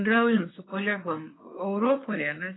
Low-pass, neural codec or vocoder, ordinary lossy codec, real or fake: 7.2 kHz; codec, 24 kHz, 1 kbps, SNAC; AAC, 16 kbps; fake